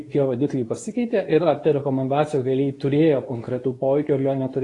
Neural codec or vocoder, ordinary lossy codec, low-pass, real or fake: codec, 24 kHz, 0.9 kbps, WavTokenizer, medium speech release version 2; AAC, 32 kbps; 10.8 kHz; fake